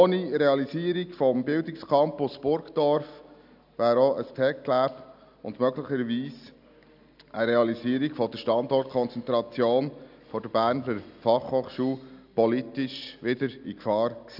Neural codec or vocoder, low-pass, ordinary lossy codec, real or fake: none; 5.4 kHz; none; real